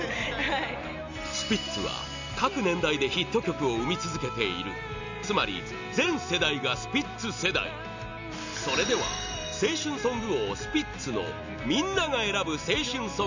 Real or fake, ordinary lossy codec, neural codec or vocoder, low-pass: real; none; none; 7.2 kHz